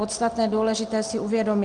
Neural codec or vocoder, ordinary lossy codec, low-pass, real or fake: none; Opus, 32 kbps; 9.9 kHz; real